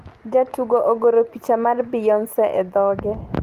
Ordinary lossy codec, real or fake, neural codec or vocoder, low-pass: Opus, 24 kbps; real; none; 14.4 kHz